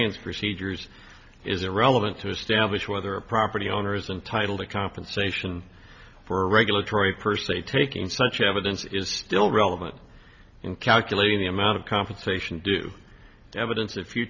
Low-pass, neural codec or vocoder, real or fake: 7.2 kHz; none; real